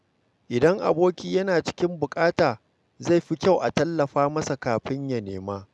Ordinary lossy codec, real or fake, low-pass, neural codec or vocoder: none; real; none; none